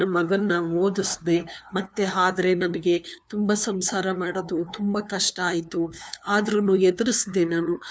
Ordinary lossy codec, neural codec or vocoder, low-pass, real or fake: none; codec, 16 kHz, 2 kbps, FunCodec, trained on LibriTTS, 25 frames a second; none; fake